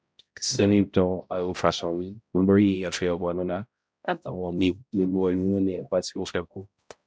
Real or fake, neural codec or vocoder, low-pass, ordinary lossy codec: fake; codec, 16 kHz, 0.5 kbps, X-Codec, HuBERT features, trained on balanced general audio; none; none